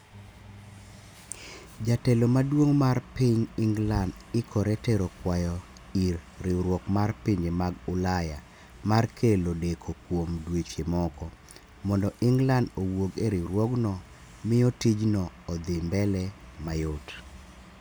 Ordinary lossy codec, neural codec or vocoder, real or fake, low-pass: none; none; real; none